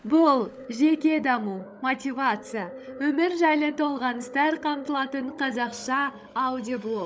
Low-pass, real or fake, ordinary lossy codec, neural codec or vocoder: none; fake; none; codec, 16 kHz, 16 kbps, FunCodec, trained on LibriTTS, 50 frames a second